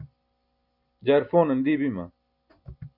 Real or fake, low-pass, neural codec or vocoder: real; 5.4 kHz; none